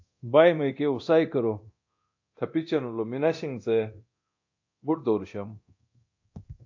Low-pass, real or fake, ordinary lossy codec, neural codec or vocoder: 7.2 kHz; fake; AAC, 48 kbps; codec, 24 kHz, 0.9 kbps, DualCodec